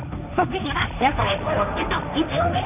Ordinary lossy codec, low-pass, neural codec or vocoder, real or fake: none; 3.6 kHz; codec, 16 kHz, 1.1 kbps, Voila-Tokenizer; fake